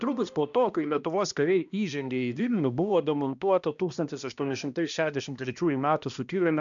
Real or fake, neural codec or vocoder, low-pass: fake; codec, 16 kHz, 1 kbps, X-Codec, HuBERT features, trained on balanced general audio; 7.2 kHz